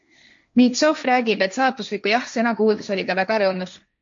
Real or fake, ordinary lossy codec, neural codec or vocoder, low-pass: fake; MP3, 48 kbps; codec, 16 kHz, 1.1 kbps, Voila-Tokenizer; 7.2 kHz